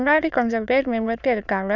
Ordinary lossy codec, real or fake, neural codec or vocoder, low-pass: none; fake; autoencoder, 22.05 kHz, a latent of 192 numbers a frame, VITS, trained on many speakers; 7.2 kHz